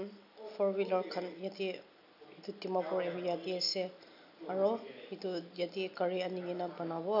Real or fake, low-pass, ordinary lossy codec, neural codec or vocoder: real; 5.4 kHz; none; none